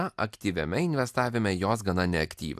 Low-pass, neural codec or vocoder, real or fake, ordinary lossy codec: 14.4 kHz; none; real; AAC, 96 kbps